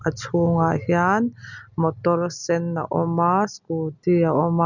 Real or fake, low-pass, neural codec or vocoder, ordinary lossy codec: real; 7.2 kHz; none; none